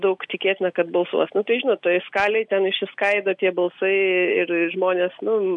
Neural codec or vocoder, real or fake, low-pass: none; real; 10.8 kHz